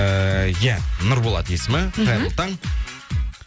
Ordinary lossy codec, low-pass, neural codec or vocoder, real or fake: none; none; none; real